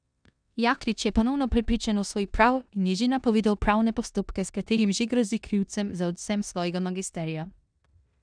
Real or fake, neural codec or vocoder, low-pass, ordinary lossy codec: fake; codec, 16 kHz in and 24 kHz out, 0.9 kbps, LongCat-Audio-Codec, four codebook decoder; 9.9 kHz; none